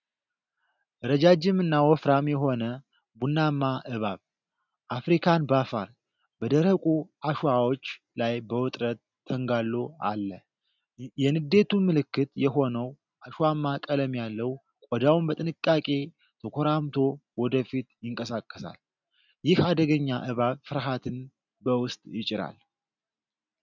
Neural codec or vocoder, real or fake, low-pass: none; real; 7.2 kHz